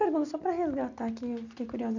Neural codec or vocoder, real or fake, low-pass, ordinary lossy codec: none; real; 7.2 kHz; none